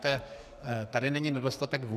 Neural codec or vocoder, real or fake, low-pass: codec, 44.1 kHz, 2.6 kbps, SNAC; fake; 14.4 kHz